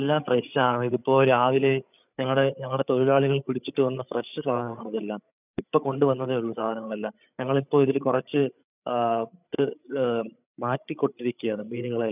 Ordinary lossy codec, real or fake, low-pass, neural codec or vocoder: none; fake; 3.6 kHz; codec, 16 kHz, 8 kbps, FreqCodec, larger model